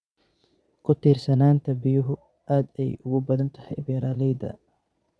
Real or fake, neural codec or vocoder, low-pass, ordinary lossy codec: fake; vocoder, 22.05 kHz, 80 mel bands, Vocos; none; none